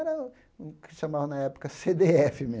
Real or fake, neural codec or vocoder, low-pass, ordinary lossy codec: real; none; none; none